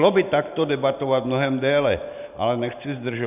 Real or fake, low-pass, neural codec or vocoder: fake; 3.6 kHz; vocoder, 44.1 kHz, 128 mel bands every 512 samples, BigVGAN v2